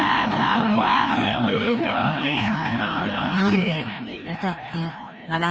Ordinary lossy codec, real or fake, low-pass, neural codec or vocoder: none; fake; none; codec, 16 kHz, 1 kbps, FreqCodec, larger model